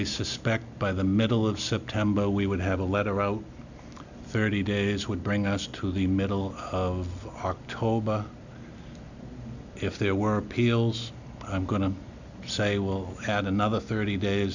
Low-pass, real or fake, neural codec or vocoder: 7.2 kHz; real; none